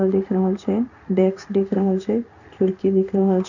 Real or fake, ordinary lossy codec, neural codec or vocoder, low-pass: fake; none; codec, 16 kHz in and 24 kHz out, 1 kbps, XY-Tokenizer; 7.2 kHz